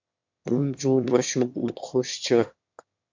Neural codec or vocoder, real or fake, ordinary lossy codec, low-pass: autoencoder, 22.05 kHz, a latent of 192 numbers a frame, VITS, trained on one speaker; fake; MP3, 64 kbps; 7.2 kHz